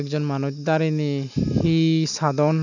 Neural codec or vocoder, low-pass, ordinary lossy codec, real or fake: none; 7.2 kHz; none; real